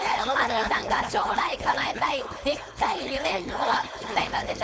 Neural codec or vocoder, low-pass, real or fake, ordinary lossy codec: codec, 16 kHz, 4.8 kbps, FACodec; none; fake; none